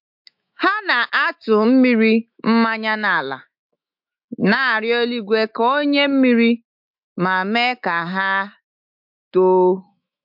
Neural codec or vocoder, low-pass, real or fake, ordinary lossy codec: autoencoder, 48 kHz, 128 numbers a frame, DAC-VAE, trained on Japanese speech; 5.4 kHz; fake; MP3, 48 kbps